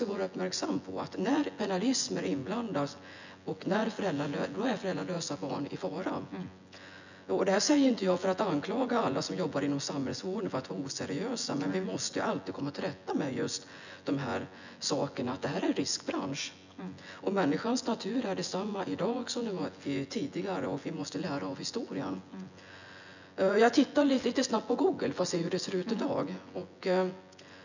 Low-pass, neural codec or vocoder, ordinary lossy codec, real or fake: 7.2 kHz; vocoder, 24 kHz, 100 mel bands, Vocos; none; fake